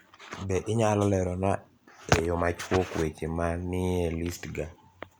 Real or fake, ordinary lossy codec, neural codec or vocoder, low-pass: real; none; none; none